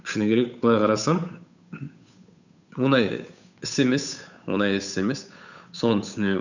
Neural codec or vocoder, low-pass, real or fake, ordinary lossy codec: codec, 16 kHz, 8 kbps, FunCodec, trained on Chinese and English, 25 frames a second; 7.2 kHz; fake; none